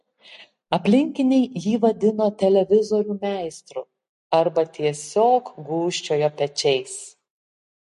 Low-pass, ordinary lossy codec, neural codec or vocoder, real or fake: 14.4 kHz; MP3, 48 kbps; none; real